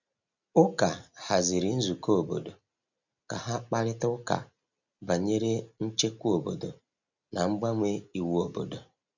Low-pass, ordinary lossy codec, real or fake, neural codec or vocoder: 7.2 kHz; none; real; none